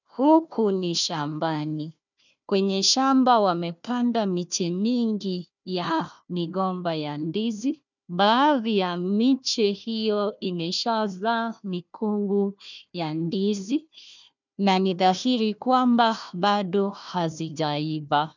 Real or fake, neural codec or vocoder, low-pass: fake; codec, 16 kHz, 1 kbps, FunCodec, trained on Chinese and English, 50 frames a second; 7.2 kHz